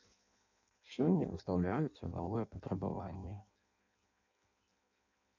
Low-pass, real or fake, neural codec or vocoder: 7.2 kHz; fake; codec, 16 kHz in and 24 kHz out, 0.6 kbps, FireRedTTS-2 codec